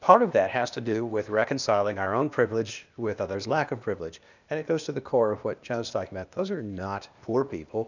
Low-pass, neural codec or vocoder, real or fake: 7.2 kHz; codec, 16 kHz, 0.8 kbps, ZipCodec; fake